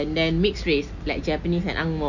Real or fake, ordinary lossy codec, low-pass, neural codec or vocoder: real; none; 7.2 kHz; none